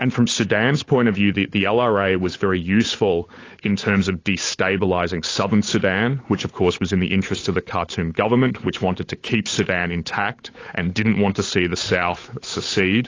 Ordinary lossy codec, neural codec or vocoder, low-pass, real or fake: AAC, 32 kbps; codec, 16 kHz, 8 kbps, FunCodec, trained on Chinese and English, 25 frames a second; 7.2 kHz; fake